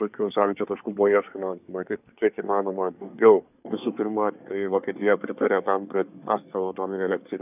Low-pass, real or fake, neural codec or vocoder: 3.6 kHz; fake; codec, 24 kHz, 1 kbps, SNAC